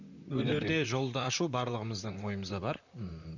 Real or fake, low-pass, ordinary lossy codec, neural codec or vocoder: fake; 7.2 kHz; none; vocoder, 44.1 kHz, 128 mel bands, Pupu-Vocoder